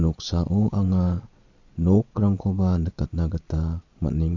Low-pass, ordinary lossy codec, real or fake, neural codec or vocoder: 7.2 kHz; MP3, 48 kbps; fake; vocoder, 22.05 kHz, 80 mel bands, Vocos